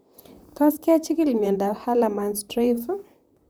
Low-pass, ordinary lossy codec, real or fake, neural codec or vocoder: none; none; fake; vocoder, 44.1 kHz, 128 mel bands, Pupu-Vocoder